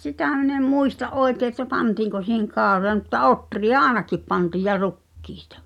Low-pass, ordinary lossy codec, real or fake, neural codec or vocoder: 19.8 kHz; none; real; none